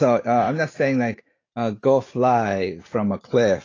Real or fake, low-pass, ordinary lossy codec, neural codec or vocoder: real; 7.2 kHz; AAC, 32 kbps; none